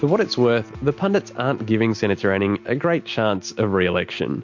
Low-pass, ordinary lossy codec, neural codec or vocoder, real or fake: 7.2 kHz; MP3, 48 kbps; none; real